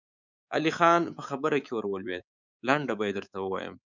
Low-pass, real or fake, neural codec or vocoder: 7.2 kHz; fake; codec, 24 kHz, 3.1 kbps, DualCodec